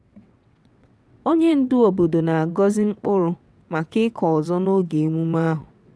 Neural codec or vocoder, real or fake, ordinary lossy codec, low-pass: vocoder, 22.05 kHz, 80 mel bands, WaveNeXt; fake; none; none